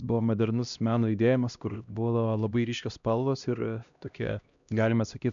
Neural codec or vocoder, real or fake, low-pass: codec, 16 kHz, 1 kbps, X-Codec, HuBERT features, trained on LibriSpeech; fake; 7.2 kHz